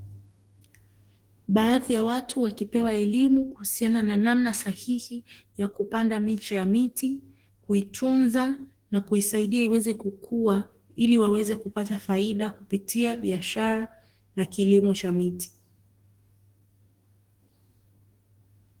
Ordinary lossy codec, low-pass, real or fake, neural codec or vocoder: Opus, 24 kbps; 19.8 kHz; fake; codec, 44.1 kHz, 2.6 kbps, DAC